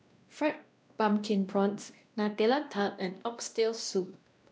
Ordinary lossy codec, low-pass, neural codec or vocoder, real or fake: none; none; codec, 16 kHz, 1 kbps, X-Codec, WavLM features, trained on Multilingual LibriSpeech; fake